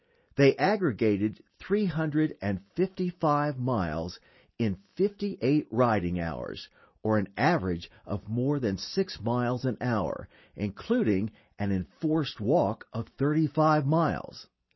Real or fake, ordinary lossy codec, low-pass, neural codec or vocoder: real; MP3, 24 kbps; 7.2 kHz; none